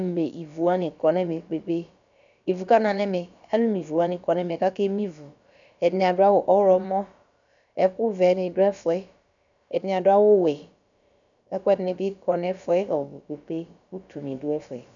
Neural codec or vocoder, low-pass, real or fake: codec, 16 kHz, about 1 kbps, DyCAST, with the encoder's durations; 7.2 kHz; fake